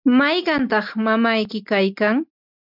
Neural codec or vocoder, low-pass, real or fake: none; 5.4 kHz; real